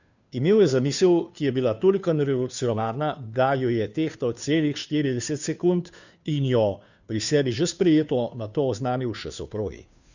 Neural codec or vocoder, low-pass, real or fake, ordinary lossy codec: codec, 16 kHz, 2 kbps, FunCodec, trained on Chinese and English, 25 frames a second; 7.2 kHz; fake; none